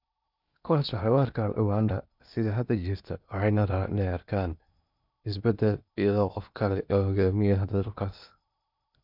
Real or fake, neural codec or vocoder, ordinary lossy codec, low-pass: fake; codec, 16 kHz in and 24 kHz out, 0.8 kbps, FocalCodec, streaming, 65536 codes; none; 5.4 kHz